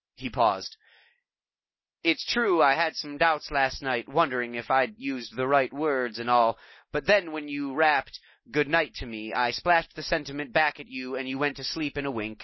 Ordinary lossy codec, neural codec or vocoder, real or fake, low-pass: MP3, 24 kbps; none; real; 7.2 kHz